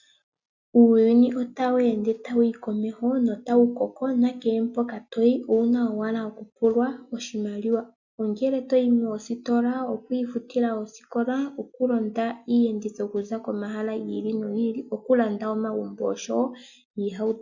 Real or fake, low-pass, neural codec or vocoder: real; 7.2 kHz; none